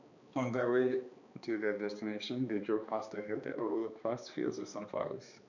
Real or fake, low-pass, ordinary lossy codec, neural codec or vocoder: fake; 7.2 kHz; none; codec, 16 kHz, 2 kbps, X-Codec, HuBERT features, trained on balanced general audio